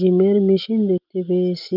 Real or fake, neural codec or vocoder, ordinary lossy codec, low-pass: real; none; Opus, 24 kbps; 5.4 kHz